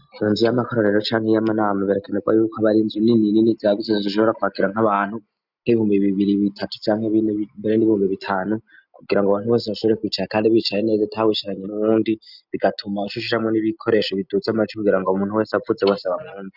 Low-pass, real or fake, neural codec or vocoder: 5.4 kHz; real; none